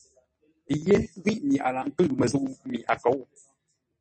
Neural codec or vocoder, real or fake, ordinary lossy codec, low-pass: none; real; MP3, 32 kbps; 10.8 kHz